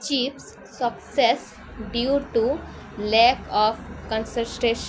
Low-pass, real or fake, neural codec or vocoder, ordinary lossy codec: none; real; none; none